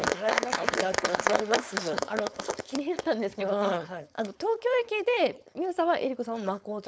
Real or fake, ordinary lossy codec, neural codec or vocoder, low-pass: fake; none; codec, 16 kHz, 4.8 kbps, FACodec; none